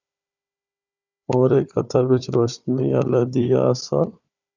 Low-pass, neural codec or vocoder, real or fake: 7.2 kHz; codec, 16 kHz, 4 kbps, FunCodec, trained on Chinese and English, 50 frames a second; fake